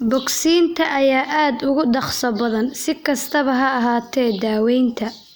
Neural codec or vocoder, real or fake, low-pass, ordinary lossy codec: none; real; none; none